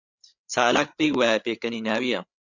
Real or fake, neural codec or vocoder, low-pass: fake; codec, 16 kHz, 8 kbps, FreqCodec, larger model; 7.2 kHz